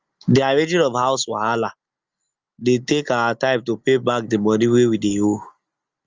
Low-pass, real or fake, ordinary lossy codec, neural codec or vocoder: 7.2 kHz; real; Opus, 32 kbps; none